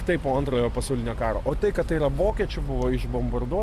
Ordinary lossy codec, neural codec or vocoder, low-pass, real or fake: Opus, 64 kbps; none; 14.4 kHz; real